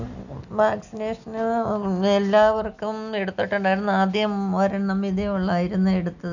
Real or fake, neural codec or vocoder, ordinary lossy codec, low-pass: real; none; none; 7.2 kHz